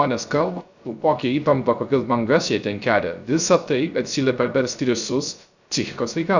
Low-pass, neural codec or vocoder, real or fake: 7.2 kHz; codec, 16 kHz, 0.3 kbps, FocalCodec; fake